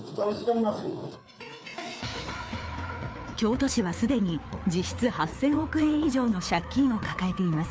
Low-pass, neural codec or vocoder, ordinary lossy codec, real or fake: none; codec, 16 kHz, 4 kbps, FreqCodec, larger model; none; fake